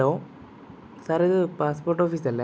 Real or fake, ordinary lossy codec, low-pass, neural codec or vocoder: real; none; none; none